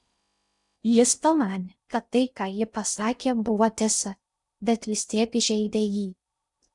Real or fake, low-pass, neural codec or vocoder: fake; 10.8 kHz; codec, 16 kHz in and 24 kHz out, 0.6 kbps, FocalCodec, streaming, 4096 codes